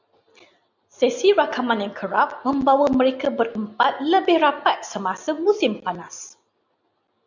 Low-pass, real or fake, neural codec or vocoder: 7.2 kHz; real; none